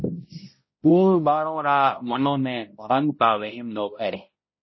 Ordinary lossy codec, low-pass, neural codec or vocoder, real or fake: MP3, 24 kbps; 7.2 kHz; codec, 16 kHz, 0.5 kbps, X-Codec, HuBERT features, trained on balanced general audio; fake